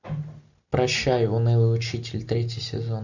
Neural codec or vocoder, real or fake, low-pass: none; real; 7.2 kHz